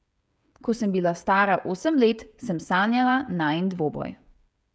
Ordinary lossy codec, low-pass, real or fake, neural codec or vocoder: none; none; fake; codec, 16 kHz, 16 kbps, FreqCodec, smaller model